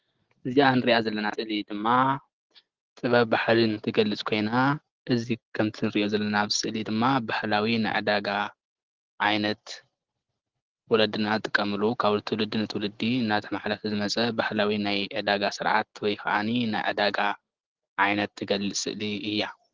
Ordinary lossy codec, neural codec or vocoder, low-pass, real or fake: Opus, 16 kbps; none; 7.2 kHz; real